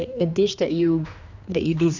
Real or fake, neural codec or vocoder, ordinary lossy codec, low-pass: fake; codec, 16 kHz, 2 kbps, X-Codec, HuBERT features, trained on general audio; none; 7.2 kHz